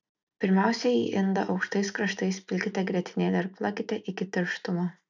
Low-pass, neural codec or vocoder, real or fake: 7.2 kHz; none; real